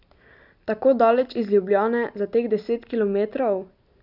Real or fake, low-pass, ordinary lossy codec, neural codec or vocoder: real; 5.4 kHz; none; none